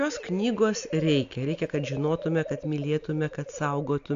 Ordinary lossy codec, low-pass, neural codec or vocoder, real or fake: AAC, 64 kbps; 7.2 kHz; none; real